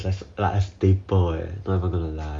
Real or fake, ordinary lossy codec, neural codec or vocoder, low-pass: real; none; none; 9.9 kHz